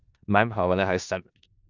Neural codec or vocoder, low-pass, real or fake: codec, 16 kHz in and 24 kHz out, 0.4 kbps, LongCat-Audio-Codec, four codebook decoder; 7.2 kHz; fake